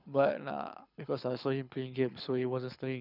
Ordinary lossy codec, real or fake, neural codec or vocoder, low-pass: none; fake; codec, 24 kHz, 6 kbps, HILCodec; 5.4 kHz